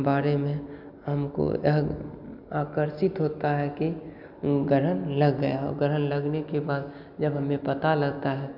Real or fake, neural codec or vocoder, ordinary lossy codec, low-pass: real; none; none; 5.4 kHz